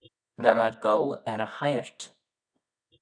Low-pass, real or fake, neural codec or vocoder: 9.9 kHz; fake; codec, 24 kHz, 0.9 kbps, WavTokenizer, medium music audio release